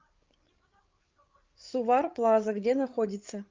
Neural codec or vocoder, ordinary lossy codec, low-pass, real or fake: codec, 16 kHz, 8 kbps, FreqCodec, larger model; Opus, 32 kbps; 7.2 kHz; fake